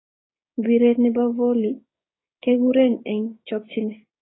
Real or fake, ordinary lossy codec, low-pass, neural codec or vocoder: fake; AAC, 16 kbps; 7.2 kHz; codec, 16 kHz, 6 kbps, DAC